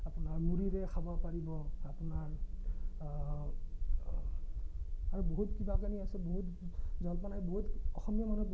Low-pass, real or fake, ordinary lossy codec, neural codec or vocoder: none; real; none; none